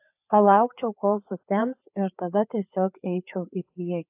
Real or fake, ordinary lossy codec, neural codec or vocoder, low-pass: fake; AAC, 32 kbps; codec, 16 kHz, 4 kbps, FreqCodec, larger model; 3.6 kHz